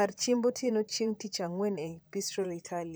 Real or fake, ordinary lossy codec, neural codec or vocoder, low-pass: fake; none; vocoder, 44.1 kHz, 128 mel bands, Pupu-Vocoder; none